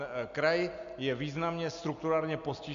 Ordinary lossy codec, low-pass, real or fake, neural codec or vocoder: Opus, 64 kbps; 7.2 kHz; real; none